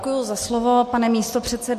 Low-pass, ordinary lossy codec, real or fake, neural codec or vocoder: 14.4 kHz; MP3, 64 kbps; real; none